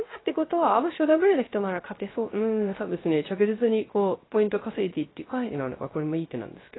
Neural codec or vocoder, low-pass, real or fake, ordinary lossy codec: codec, 16 kHz, 0.3 kbps, FocalCodec; 7.2 kHz; fake; AAC, 16 kbps